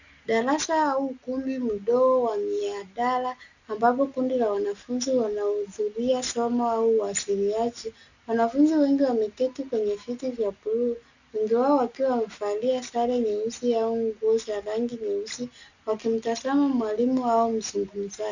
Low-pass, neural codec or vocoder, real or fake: 7.2 kHz; none; real